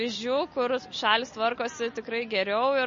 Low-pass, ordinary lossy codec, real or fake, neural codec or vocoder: 7.2 kHz; MP3, 32 kbps; real; none